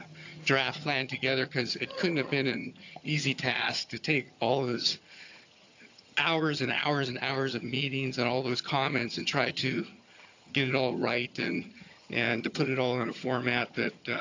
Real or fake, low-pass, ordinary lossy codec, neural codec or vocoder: fake; 7.2 kHz; AAC, 48 kbps; vocoder, 22.05 kHz, 80 mel bands, HiFi-GAN